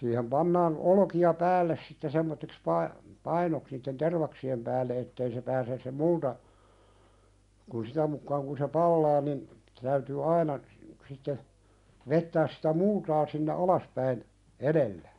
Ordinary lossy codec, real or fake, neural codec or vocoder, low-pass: none; real; none; 10.8 kHz